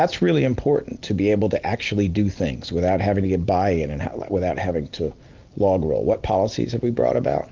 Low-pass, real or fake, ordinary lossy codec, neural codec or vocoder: 7.2 kHz; real; Opus, 24 kbps; none